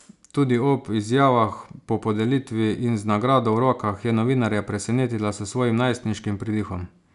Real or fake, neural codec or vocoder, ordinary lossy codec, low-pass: real; none; none; 10.8 kHz